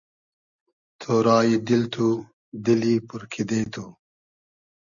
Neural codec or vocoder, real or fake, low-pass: none; real; 7.2 kHz